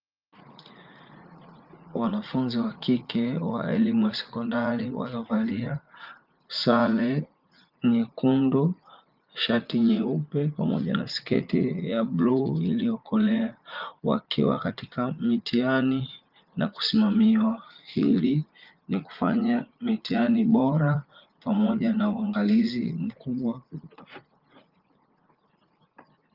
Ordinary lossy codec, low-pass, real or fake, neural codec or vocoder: Opus, 24 kbps; 5.4 kHz; fake; vocoder, 44.1 kHz, 80 mel bands, Vocos